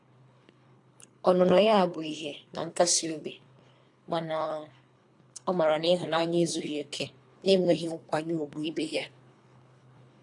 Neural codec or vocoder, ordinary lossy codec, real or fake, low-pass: codec, 24 kHz, 3 kbps, HILCodec; AAC, 48 kbps; fake; 10.8 kHz